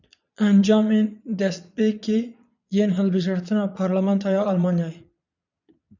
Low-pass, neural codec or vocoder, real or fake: 7.2 kHz; vocoder, 22.05 kHz, 80 mel bands, Vocos; fake